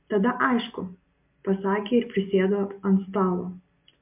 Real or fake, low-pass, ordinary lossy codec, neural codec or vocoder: real; 3.6 kHz; MP3, 32 kbps; none